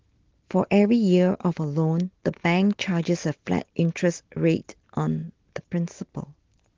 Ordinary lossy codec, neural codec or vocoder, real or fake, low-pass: Opus, 16 kbps; none; real; 7.2 kHz